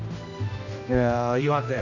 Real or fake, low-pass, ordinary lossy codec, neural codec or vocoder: fake; 7.2 kHz; none; codec, 16 kHz, 1 kbps, X-Codec, HuBERT features, trained on general audio